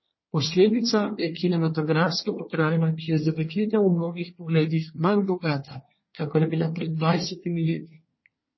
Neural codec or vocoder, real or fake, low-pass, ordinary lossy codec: codec, 24 kHz, 1 kbps, SNAC; fake; 7.2 kHz; MP3, 24 kbps